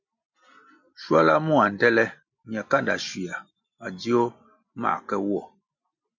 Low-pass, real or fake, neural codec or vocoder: 7.2 kHz; real; none